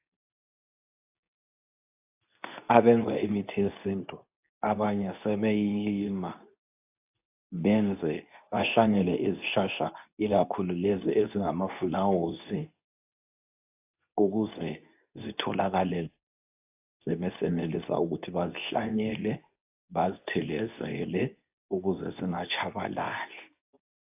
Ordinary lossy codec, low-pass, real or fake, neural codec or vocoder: AAC, 32 kbps; 3.6 kHz; fake; codec, 24 kHz, 0.9 kbps, WavTokenizer, medium speech release version 2